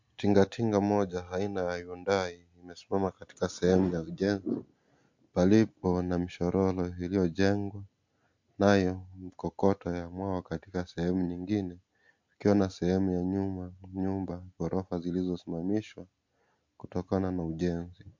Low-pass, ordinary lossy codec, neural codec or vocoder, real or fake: 7.2 kHz; MP3, 48 kbps; none; real